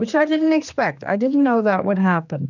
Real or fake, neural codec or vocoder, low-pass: fake; codec, 16 kHz, 2 kbps, X-Codec, HuBERT features, trained on general audio; 7.2 kHz